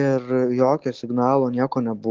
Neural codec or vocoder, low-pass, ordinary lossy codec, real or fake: none; 7.2 kHz; Opus, 16 kbps; real